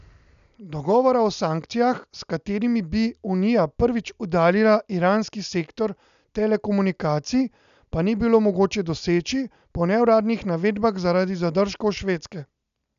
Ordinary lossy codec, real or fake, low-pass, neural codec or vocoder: none; real; 7.2 kHz; none